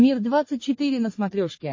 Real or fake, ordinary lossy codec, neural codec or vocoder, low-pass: fake; MP3, 32 kbps; codec, 44.1 kHz, 3.4 kbps, Pupu-Codec; 7.2 kHz